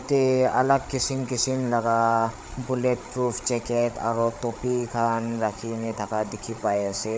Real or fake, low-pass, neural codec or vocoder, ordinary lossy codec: fake; none; codec, 16 kHz, 8 kbps, FreqCodec, larger model; none